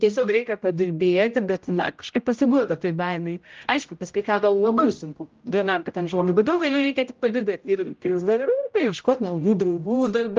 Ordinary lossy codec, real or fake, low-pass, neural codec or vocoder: Opus, 24 kbps; fake; 7.2 kHz; codec, 16 kHz, 0.5 kbps, X-Codec, HuBERT features, trained on general audio